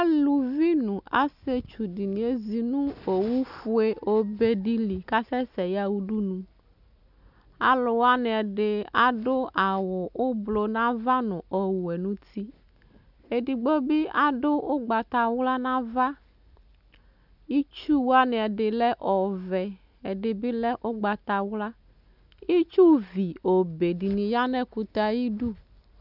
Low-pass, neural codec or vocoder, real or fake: 5.4 kHz; none; real